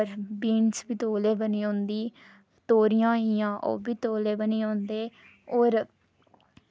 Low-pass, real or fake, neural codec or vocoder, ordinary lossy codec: none; real; none; none